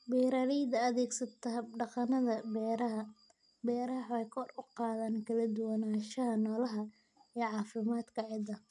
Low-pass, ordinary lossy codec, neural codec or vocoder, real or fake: 10.8 kHz; none; none; real